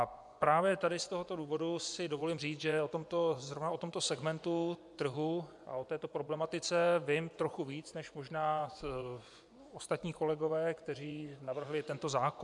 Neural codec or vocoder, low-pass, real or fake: vocoder, 24 kHz, 100 mel bands, Vocos; 10.8 kHz; fake